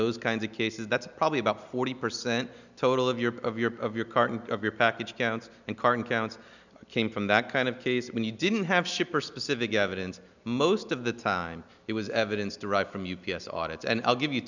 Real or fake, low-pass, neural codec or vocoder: real; 7.2 kHz; none